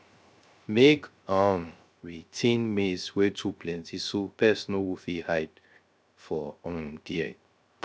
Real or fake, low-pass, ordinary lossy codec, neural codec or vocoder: fake; none; none; codec, 16 kHz, 0.3 kbps, FocalCodec